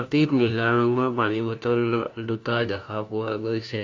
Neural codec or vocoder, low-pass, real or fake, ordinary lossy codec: codec, 16 kHz, 1 kbps, FunCodec, trained on LibriTTS, 50 frames a second; 7.2 kHz; fake; AAC, 48 kbps